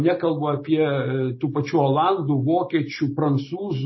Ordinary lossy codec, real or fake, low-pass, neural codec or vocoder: MP3, 24 kbps; real; 7.2 kHz; none